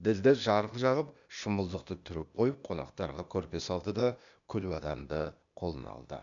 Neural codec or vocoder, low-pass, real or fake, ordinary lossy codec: codec, 16 kHz, 0.8 kbps, ZipCodec; 7.2 kHz; fake; MP3, 96 kbps